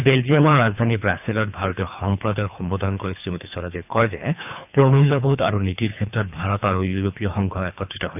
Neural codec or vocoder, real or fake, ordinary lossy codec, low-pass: codec, 24 kHz, 3 kbps, HILCodec; fake; none; 3.6 kHz